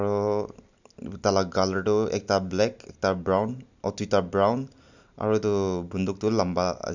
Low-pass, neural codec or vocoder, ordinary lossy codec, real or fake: 7.2 kHz; none; none; real